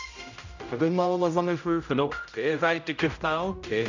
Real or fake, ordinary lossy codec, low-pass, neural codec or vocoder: fake; none; 7.2 kHz; codec, 16 kHz, 0.5 kbps, X-Codec, HuBERT features, trained on general audio